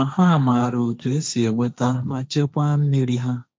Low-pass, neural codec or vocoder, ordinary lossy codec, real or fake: none; codec, 16 kHz, 1.1 kbps, Voila-Tokenizer; none; fake